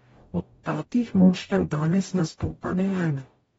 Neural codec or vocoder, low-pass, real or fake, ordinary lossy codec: codec, 44.1 kHz, 0.9 kbps, DAC; 19.8 kHz; fake; AAC, 24 kbps